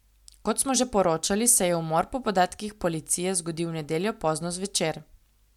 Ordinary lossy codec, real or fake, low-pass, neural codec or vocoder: MP3, 96 kbps; real; 19.8 kHz; none